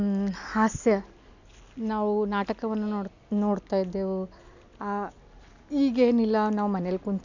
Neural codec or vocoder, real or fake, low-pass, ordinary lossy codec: none; real; 7.2 kHz; none